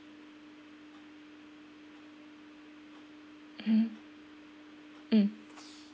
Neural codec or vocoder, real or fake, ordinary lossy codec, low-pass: none; real; none; none